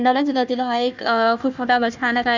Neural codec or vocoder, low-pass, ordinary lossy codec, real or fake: codec, 16 kHz, 1 kbps, FunCodec, trained on Chinese and English, 50 frames a second; 7.2 kHz; none; fake